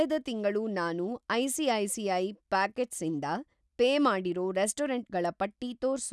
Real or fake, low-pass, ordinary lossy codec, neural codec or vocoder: real; none; none; none